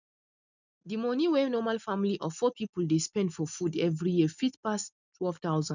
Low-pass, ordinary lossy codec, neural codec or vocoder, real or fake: 7.2 kHz; none; none; real